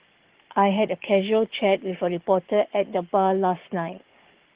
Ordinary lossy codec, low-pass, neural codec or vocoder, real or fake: Opus, 16 kbps; 3.6 kHz; codec, 44.1 kHz, 7.8 kbps, Pupu-Codec; fake